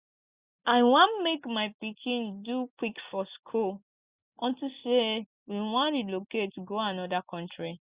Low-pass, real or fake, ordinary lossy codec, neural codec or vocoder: 3.6 kHz; real; Opus, 64 kbps; none